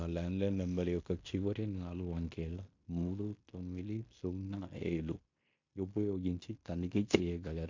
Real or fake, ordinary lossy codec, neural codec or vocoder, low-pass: fake; MP3, 48 kbps; codec, 16 kHz in and 24 kHz out, 0.9 kbps, LongCat-Audio-Codec, fine tuned four codebook decoder; 7.2 kHz